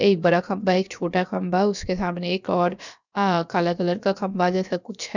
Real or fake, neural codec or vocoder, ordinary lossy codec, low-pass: fake; codec, 16 kHz, about 1 kbps, DyCAST, with the encoder's durations; none; 7.2 kHz